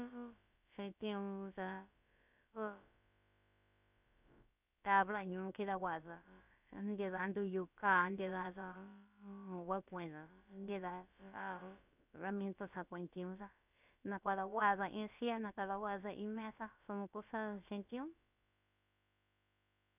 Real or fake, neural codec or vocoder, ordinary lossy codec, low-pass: fake; codec, 16 kHz, about 1 kbps, DyCAST, with the encoder's durations; none; 3.6 kHz